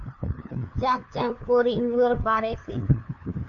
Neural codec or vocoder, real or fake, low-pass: codec, 16 kHz, 2 kbps, FunCodec, trained on LibriTTS, 25 frames a second; fake; 7.2 kHz